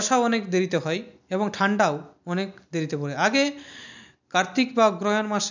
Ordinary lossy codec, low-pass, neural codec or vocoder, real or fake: none; 7.2 kHz; none; real